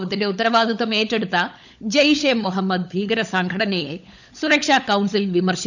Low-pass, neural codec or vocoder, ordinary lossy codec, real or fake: 7.2 kHz; codec, 16 kHz, 16 kbps, FunCodec, trained on LibriTTS, 50 frames a second; none; fake